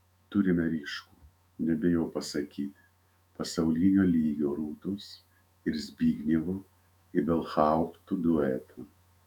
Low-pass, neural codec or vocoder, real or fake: 19.8 kHz; autoencoder, 48 kHz, 128 numbers a frame, DAC-VAE, trained on Japanese speech; fake